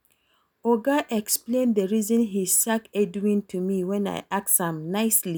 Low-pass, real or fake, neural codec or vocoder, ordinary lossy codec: none; real; none; none